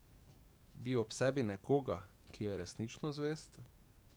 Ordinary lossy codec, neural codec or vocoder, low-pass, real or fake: none; codec, 44.1 kHz, 7.8 kbps, DAC; none; fake